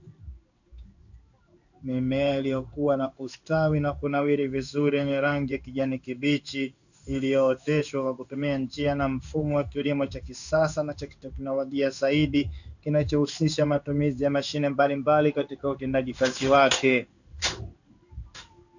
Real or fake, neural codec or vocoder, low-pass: fake; codec, 16 kHz in and 24 kHz out, 1 kbps, XY-Tokenizer; 7.2 kHz